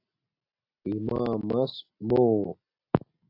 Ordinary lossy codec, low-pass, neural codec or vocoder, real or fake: AAC, 32 kbps; 5.4 kHz; none; real